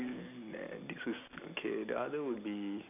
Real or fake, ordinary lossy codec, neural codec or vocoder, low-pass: real; none; none; 3.6 kHz